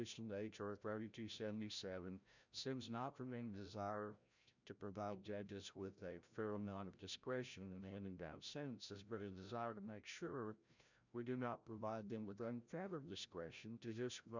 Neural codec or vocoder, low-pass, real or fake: codec, 16 kHz, 0.5 kbps, FreqCodec, larger model; 7.2 kHz; fake